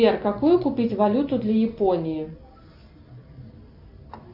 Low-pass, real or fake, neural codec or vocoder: 5.4 kHz; real; none